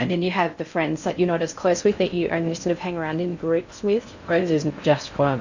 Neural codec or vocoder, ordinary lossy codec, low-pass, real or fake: codec, 16 kHz in and 24 kHz out, 0.6 kbps, FocalCodec, streaming, 4096 codes; Opus, 64 kbps; 7.2 kHz; fake